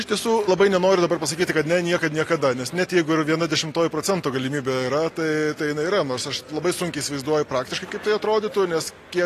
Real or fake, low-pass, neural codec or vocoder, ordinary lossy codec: real; 14.4 kHz; none; AAC, 48 kbps